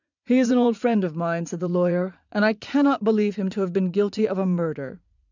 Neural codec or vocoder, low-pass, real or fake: vocoder, 44.1 kHz, 80 mel bands, Vocos; 7.2 kHz; fake